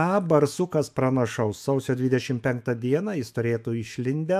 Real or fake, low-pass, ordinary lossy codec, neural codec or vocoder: fake; 14.4 kHz; AAC, 96 kbps; autoencoder, 48 kHz, 128 numbers a frame, DAC-VAE, trained on Japanese speech